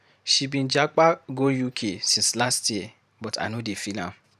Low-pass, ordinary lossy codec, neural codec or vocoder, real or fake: 14.4 kHz; none; vocoder, 44.1 kHz, 128 mel bands every 512 samples, BigVGAN v2; fake